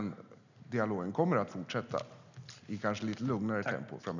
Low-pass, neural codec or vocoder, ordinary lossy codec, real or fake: 7.2 kHz; none; none; real